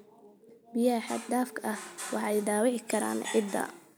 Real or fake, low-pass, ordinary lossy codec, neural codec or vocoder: real; none; none; none